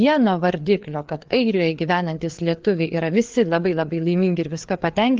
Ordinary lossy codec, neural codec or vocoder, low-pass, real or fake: Opus, 16 kbps; codec, 16 kHz, 4 kbps, FreqCodec, larger model; 7.2 kHz; fake